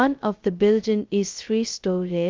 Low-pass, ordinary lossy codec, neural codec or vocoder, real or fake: 7.2 kHz; Opus, 32 kbps; codec, 16 kHz, 0.2 kbps, FocalCodec; fake